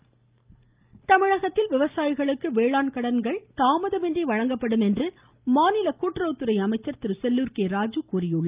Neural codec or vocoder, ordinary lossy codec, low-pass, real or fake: none; Opus, 24 kbps; 3.6 kHz; real